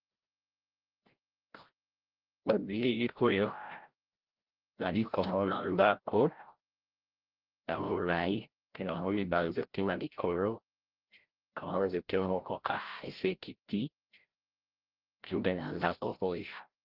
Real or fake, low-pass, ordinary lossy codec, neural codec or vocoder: fake; 5.4 kHz; Opus, 16 kbps; codec, 16 kHz, 0.5 kbps, FreqCodec, larger model